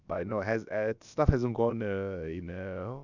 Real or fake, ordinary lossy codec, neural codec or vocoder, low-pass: fake; none; codec, 16 kHz, about 1 kbps, DyCAST, with the encoder's durations; 7.2 kHz